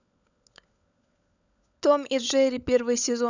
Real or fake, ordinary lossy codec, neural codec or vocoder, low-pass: fake; none; codec, 16 kHz, 16 kbps, FunCodec, trained on LibriTTS, 50 frames a second; 7.2 kHz